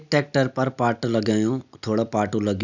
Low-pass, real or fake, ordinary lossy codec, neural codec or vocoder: 7.2 kHz; real; none; none